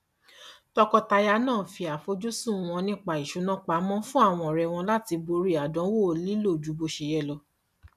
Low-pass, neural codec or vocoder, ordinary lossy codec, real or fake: 14.4 kHz; none; none; real